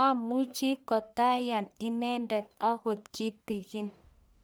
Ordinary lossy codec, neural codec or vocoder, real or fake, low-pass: none; codec, 44.1 kHz, 1.7 kbps, Pupu-Codec; fake; none